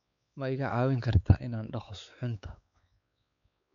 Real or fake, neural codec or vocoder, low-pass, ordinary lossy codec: fake; codec, 16 kHz, 4 kbps, X-Codec, WavLM features, trained on Multilingual LibriSpeech; 7.2 kHz; MP3, 96 kbps